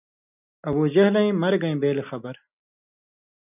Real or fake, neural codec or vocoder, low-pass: real; none; 3.6 kHz